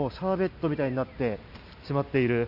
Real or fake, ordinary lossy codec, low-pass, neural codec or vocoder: real; none; 5.4 kHz; none